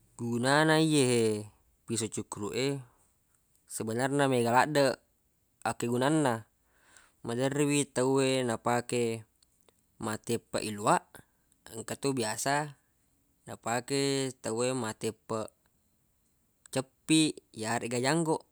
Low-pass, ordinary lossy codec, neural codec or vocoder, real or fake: none; none; none; real